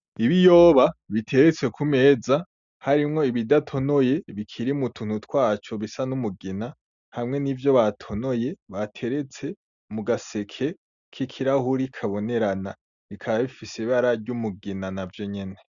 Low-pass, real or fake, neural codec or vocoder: 7.2 kHz; real; none